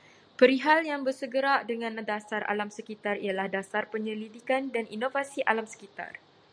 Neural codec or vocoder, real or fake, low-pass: none; real; 9.9 kHz